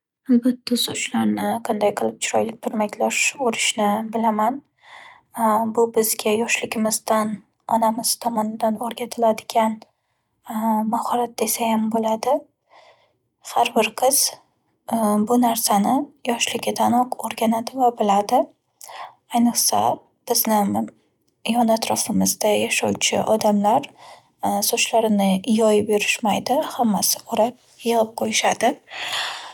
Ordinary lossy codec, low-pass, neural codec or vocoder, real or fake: none; 19.8 kHz; none; real